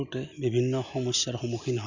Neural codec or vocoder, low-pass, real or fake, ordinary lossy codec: none; 7.2 kHz; real; none